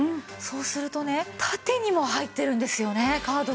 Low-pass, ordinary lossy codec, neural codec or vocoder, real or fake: none; none; none; real